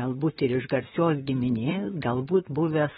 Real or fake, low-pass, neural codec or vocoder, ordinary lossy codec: fake; 19.8 kHz; vocoder, 44.1 kHz, 128 mel bands, Pupu-Vocoder; AAC, 16 kbps